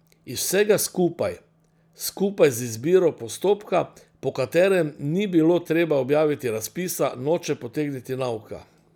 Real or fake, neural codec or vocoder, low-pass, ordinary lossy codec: real; none; none; none